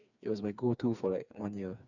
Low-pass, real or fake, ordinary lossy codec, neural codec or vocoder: 7.2 kHz; fake; none; codec, 16 kHz, 4 kbps, FreqCodec, smaller model